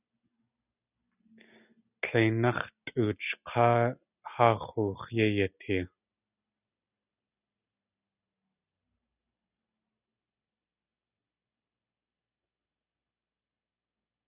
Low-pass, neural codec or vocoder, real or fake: 3.6 kHz; none; real